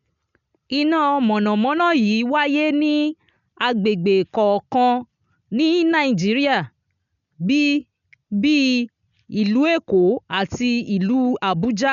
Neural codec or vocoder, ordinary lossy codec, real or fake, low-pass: none; MP3, 96 kbps; real; 7.2 kHz